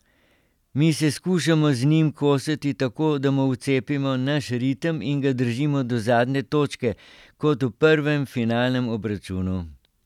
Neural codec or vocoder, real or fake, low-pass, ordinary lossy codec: none; real; 19.8 kHz; none